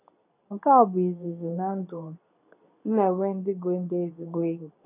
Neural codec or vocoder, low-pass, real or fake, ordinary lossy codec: codec, 24 kHz, 0.9 kbps, WavTokenizer, medium speech release version 2; 3.6 kHz; fake; none